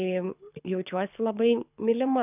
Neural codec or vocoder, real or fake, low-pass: codec, 24 kHz, 6 kbps, HILCodec; fake; 3.6 kHz